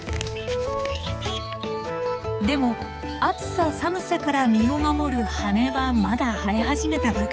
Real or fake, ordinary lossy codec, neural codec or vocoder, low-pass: fake; none; codec, 16 kHz, 4 kbps, X-Codec, HuBERT features, trained on balanced general audio; none